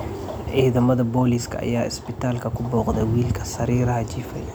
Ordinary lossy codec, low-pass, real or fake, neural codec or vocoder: none; none; real; none